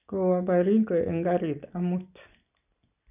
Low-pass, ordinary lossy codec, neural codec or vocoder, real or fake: 3.6 kHz; none; none; real